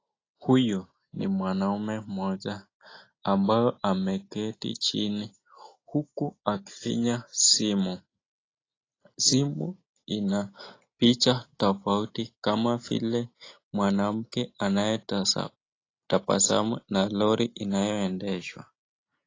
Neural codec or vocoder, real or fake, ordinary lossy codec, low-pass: none; real; AAC, 32 kbps; 7.2 kHz